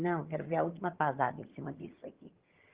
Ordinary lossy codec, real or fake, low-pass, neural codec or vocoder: Opus, 16 kbps; fake; 3.6 kHz; vocoder, 22.05 kHz, 80 mel bands, HiFi-GAN